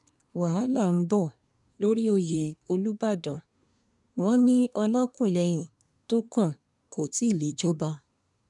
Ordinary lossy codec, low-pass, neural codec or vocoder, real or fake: none; 10.8 kHz; codec, 24 kHz, 1 kbps, SNAC; fake